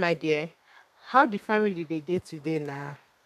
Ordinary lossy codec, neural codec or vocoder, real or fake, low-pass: none; codec, 32 kHz, 1.9 kbps, SNAC; fake; 14.4 kHz